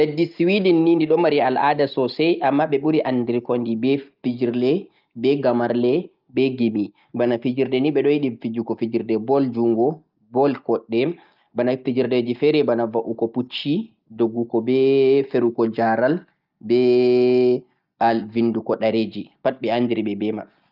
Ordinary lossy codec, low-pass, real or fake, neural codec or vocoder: Opus, 32 kbps; 5.4 kHz; real; none